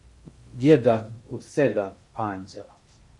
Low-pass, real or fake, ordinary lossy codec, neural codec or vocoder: 10.8 kHz; fake; MP3, 48 kbps; codec, 16 kHz in and 24 kHz out, 0.6 kbps, FocalCodec, streaming, 2048 codes